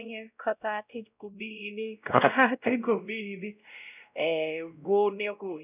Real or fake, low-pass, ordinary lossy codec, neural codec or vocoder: fake; 3.6 kHz; none; codec, 16 kHz, 0.5 kbps, X-Codec, WavLM features, trained on Multilingual LibriSpeech